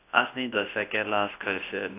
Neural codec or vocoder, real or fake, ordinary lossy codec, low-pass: codec, 24 kHz, 0.9 kbps, WavTokenizer, large speech release; fake; AAC, 24 kbps; 3.6 kHz